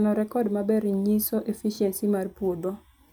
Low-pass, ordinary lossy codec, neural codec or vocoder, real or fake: none; none; none; real